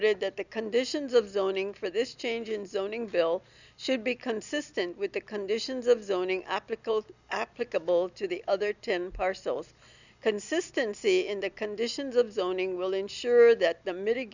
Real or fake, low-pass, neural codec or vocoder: real; 7.2 kHz; none